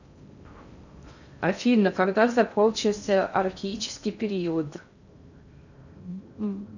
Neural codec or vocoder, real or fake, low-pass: codec, 16 kHz in and 24 kHz out, 0.6 kbps, FocalCodec, streaming, 2048 codes; fake; 7.2 kHz